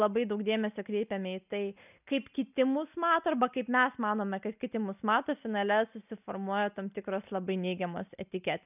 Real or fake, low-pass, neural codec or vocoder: real; 3.6 kHz; none